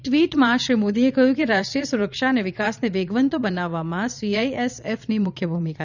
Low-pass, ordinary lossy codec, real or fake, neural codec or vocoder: 7.2 kHz; none; fake; vocoder, 22.05 kHz, 80 mel bands, Vocos